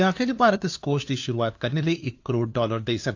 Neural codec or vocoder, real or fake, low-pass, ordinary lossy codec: codec, 16 kHz, 2 kbps, FunCodec, trained on LibriTTS, 25 frames a second; fake; 7.2 kHz; AAC, 48 kbps